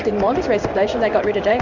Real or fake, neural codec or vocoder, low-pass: fake; codec, 16 kHz in and 24 kHz out, 1 kbps, XY-Tokenizer; 7.2 kHz